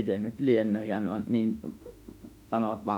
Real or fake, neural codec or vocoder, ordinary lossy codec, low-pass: fake; autoencoder, 48 kHz, 32 numbers a frame, DAC-VAE, trained on Japanese speech; none; 19.8 kHz